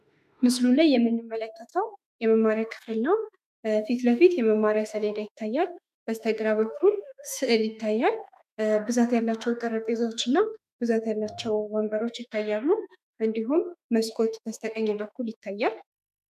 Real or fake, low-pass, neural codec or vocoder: fake; 14.4 kHz; autoencoder, 48 kHz, 32 numbers a frame, DAC-VAE, trained on Japanese speech